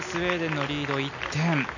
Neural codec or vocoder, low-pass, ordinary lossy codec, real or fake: none; 7.2 kHz; none; real